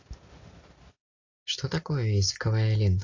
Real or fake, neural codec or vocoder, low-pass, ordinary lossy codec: real; none; 7.2 kHz; AAC, 32 kbps